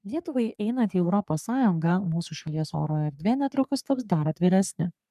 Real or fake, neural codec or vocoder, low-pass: fake; codec, 44.1 kHz, 3.4 kbps, Pupu-Codec; 14.4 kHz